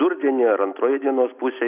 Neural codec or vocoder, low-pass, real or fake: none; 3.6 kHz; real